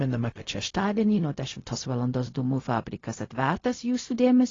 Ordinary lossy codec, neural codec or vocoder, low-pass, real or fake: AAC, 32 kbps; codec, 16 kHz, 0.4 kbps, LongCat-Audio-Codec; 7.2 kHz; fake